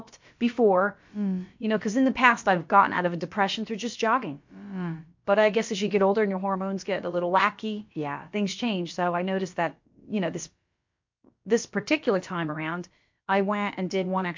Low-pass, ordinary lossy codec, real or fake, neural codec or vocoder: 7.2 kHz; MP3, 48 kbps; fake; codec, 16 kHz, about 1 kbps, DyCAST, with the encoder's durations